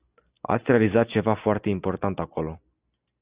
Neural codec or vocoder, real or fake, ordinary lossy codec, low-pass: none; real; Opus, 64 kbps; 3.6 kHz